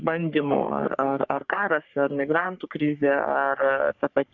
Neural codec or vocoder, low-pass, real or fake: codec, 44.1 kHz, 3.4 kbps, Pupu-Codec; 7.2 kHz; fake